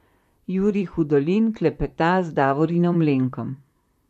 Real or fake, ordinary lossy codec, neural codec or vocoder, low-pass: fake; MP3, 64 kbps; vocoder, 44.1 kHz, 128 mel bands, Pupu-Vocoder; 14.4 kHz